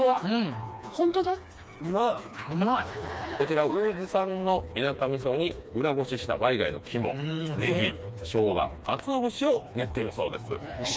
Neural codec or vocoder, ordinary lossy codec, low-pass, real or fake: codec, 16 kHz, 2 kbps, FreqCodec, smaller model; none; none; fake